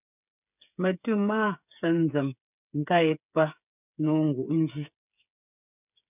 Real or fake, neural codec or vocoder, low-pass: fake; codec, 16 kHz, 8 kbps, FreqCodec, smaller model; 3.6 kHz